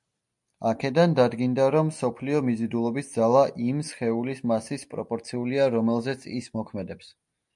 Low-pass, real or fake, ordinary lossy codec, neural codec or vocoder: 10.8 kHz; real; MP3, 96 kbps; none